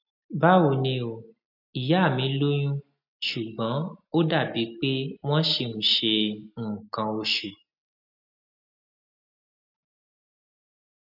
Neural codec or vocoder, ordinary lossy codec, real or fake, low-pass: none; none; real; 5.4 kHz